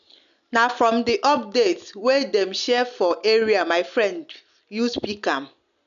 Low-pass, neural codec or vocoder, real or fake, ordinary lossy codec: 7.2 kHz; none; real; none